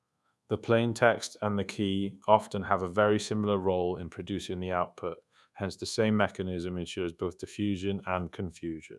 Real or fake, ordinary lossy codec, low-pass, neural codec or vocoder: fake; none; none; codec, 24 kHz, 1.2 kbps, DualCodec